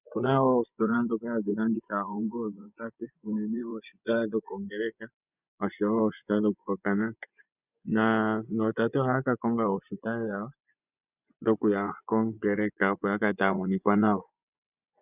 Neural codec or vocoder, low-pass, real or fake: vocoder, 24 kHz, 100 mel bands, Vocos; 3.6 kHz; fake